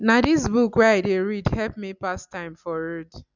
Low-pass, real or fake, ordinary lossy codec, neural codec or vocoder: 7.2 kHz; real; none; none